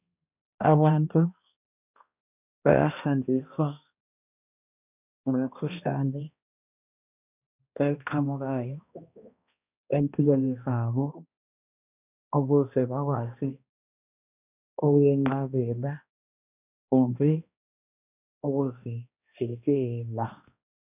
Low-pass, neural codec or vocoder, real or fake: 3.6 kHz; codec, 16 kHz, 1 kbps, X-Codec, HuBERT features, trained on balanced general audio; fake